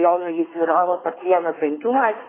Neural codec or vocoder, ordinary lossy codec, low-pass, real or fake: codec, 24 kHz, 1 kbps, SNAC; AAC, 16 kbps; 3.6 kHz; fake